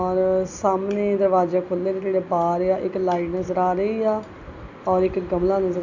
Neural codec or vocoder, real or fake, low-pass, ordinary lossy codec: none; real; 7.2 kHz; none